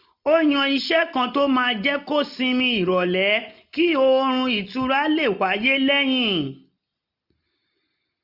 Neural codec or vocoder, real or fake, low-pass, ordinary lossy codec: none; real; 5.4 kHz; none